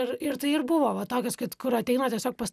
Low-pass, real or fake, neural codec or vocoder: 14.4 kHz; real; none